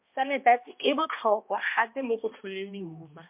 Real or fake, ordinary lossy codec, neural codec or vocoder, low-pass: fake; MP3, 32 kbps; codec, 16 kHz, 1 kbps, X-Codec, HuBERT features, trained on balanced general audio; 3.6 kHz